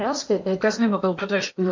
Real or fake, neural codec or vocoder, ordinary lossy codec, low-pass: fake; codec, 16 kHz in and 24 kHz out, 0.8 kbps, FocalCodec, streaming, 65536 codes; MP3, 48 kbps; 7.2 kHz